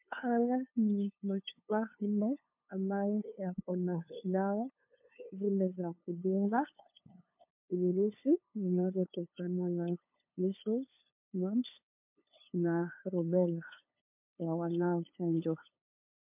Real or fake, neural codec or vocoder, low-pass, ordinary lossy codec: fake; codec, 16 kHz, 2 kbps, FunCodec, trained on LibriTTS, 25 frames a second; 3.6 kHz; MP3, 32 kbps